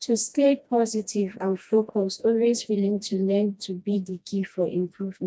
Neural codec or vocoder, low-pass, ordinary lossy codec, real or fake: codec, 16 kHz, 1 kbps, FreqCodec, smaller model; none; none; fake